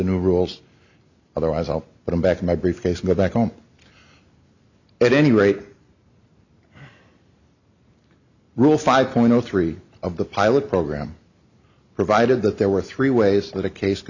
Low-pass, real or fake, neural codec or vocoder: 7.2 kHz; real; none